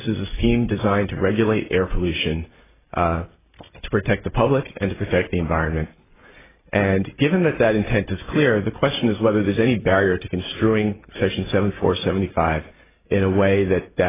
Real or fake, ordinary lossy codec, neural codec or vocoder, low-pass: real; AAC, 16 kbps; none; 3.6 kHz